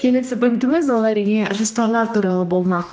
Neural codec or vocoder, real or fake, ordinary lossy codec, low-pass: codec, 16 kHz, 1 kbps, X-Codec, HuBERT features, trained on general audio; fake; none; none